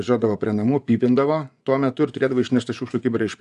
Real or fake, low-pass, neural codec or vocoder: fake; 10.8 kHz; vocoder, 24 kHz, 100 mel bands, Vocos